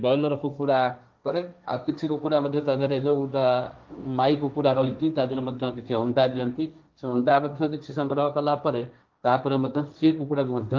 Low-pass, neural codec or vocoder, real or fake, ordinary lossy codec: 7.2 kHz; codec, 16 kHz, 1.1 kbps, Voila-Tokenizer; fake; Opus, 24 kbps